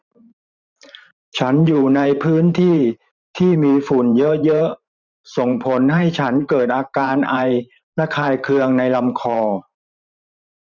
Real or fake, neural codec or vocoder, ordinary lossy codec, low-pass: fake; vocoder, 44.1 kHz, 128 mel bands every 512 samples, BigVGAN v2; none; 7.2 kHz